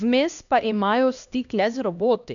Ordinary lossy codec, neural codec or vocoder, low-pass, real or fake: none; codec, 16 kHz, 1 kbps, X-Codec, HuBERT features, trained on LibriSpeech; 7.2 kHz; fake